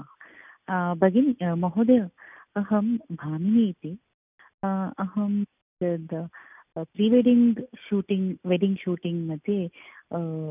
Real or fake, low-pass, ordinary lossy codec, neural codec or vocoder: real; 3.6 kHz; none; none